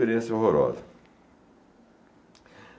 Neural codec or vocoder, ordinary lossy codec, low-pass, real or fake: none; none; none; real